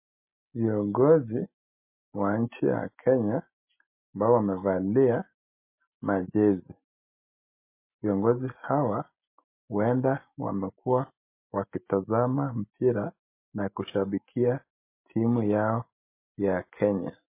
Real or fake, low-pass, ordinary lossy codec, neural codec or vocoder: real; 3.6 kHz; MP3, 24 kbps; none